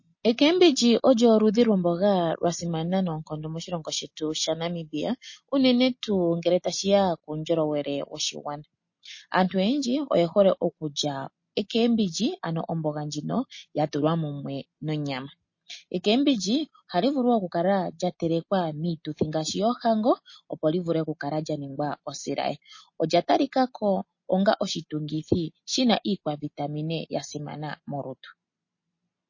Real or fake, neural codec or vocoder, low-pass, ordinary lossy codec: real; none; 7.2 kHz; MP3, 32 kbps